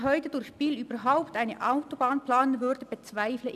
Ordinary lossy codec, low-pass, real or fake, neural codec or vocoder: none; 14.4 kHz; real; none